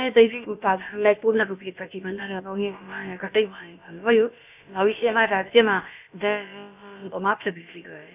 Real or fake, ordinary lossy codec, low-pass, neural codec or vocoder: fake; none; 3.6 kHz; codec, 16 kHz, about 1 kbps, DyCAST, with the encoder's durations